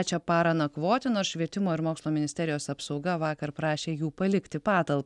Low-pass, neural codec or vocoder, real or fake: 10.8 kHz; none; real